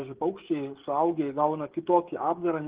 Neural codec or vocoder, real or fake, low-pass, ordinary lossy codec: codec, 16 kHz, 16 kbps, FreqCodec, smaller model; fake; 3.6 kHz; Opus, 16 kbps